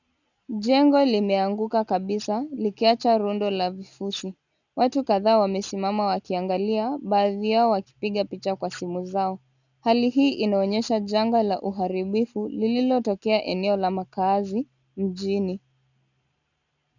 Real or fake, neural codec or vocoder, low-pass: real; none; 7.2 kHz